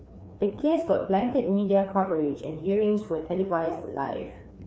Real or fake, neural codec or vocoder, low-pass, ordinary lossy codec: fake; codec, 16 kHz, 2 kbps, FreqCodec, larger model; none; none